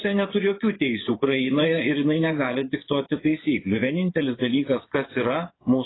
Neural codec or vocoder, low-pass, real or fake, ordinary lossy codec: vocoder, 24 kHz, 100 mel bands, Vocos; 7.2 kHz; fake; AAC, 16 kbps